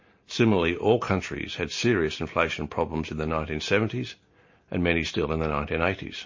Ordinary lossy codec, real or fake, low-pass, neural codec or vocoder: MP3, 32 kbps; real; 7.2 kHz; none